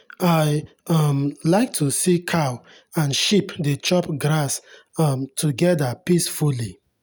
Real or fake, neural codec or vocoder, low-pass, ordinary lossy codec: real; none; none; none